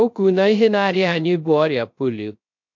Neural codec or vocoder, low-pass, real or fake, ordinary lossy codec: codec, 16 kHz, 0.3 kbps, FocalCodec; 7.2 kHz; fake; MP3, 48 kbps